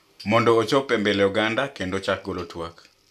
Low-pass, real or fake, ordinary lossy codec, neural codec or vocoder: 14.4 kHz; real; none; none